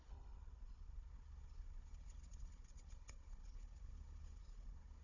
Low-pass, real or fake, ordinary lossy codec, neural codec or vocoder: 7.2 kHz; fake; none; codec, 16 kHz, 16 kbps, FunCodec, trained on Chinese and English, 50 frames a second